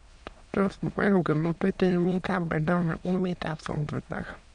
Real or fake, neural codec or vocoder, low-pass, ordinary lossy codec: fake; autoencoder, 22.05 kHz, a latent of 192 numbers a frame, VITS, trained on many speakers; 9.9 kHz; none